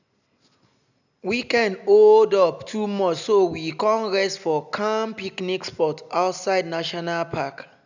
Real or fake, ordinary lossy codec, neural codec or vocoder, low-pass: real; none; none; 7.2 kHz